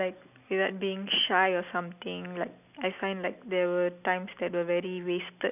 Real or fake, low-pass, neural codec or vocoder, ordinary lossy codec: real; 3.6 kHz; none; none